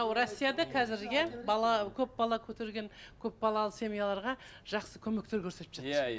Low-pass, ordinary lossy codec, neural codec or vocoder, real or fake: none; none; none; real